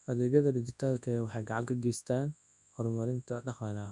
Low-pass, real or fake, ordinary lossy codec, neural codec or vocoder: 10.8 kHz; fake; none; codec, 24 kHz, 0.9 kbps, WavTokenizer, large speech release